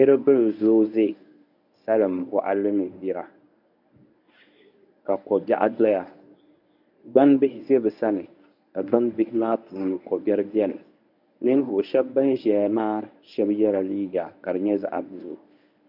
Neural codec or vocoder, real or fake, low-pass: codec, 24 kHz, 0.9 kbps, WavTokenizer, medium speech release version 1; fake; 5.4 kHz